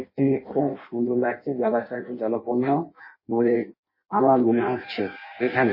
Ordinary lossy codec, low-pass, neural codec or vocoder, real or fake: MP3, 24 kbps; 5.4 kHz; codec, 16 kHz in and 24 kHz out, 0.6 kbps, FireRedTTS-2 codec; fake